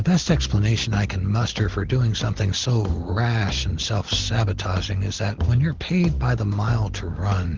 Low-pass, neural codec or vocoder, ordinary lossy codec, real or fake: 7.2 kHz; none; Opus, 16 kbps; real